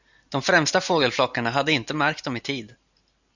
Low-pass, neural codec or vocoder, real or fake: 7.2 kHz; none; real